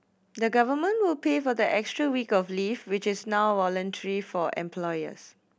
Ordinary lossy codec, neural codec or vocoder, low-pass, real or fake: none; none; none; real